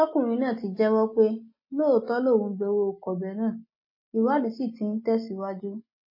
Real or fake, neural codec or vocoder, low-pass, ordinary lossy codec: real; none; 5.4 kHz; MP3, 24 kbps